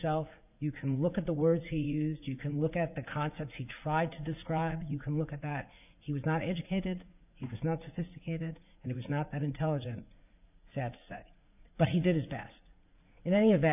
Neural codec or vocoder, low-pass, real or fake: vocoder, 44.1 kHz, 80 mel bands, Vocos; 3.6 kHz; fake